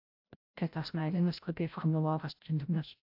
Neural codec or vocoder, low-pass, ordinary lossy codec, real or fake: codec, 16 kHz, 0.5 kbps, FreqCodec, larger model; 5.4 kHz; AAC, 48 kbps; fake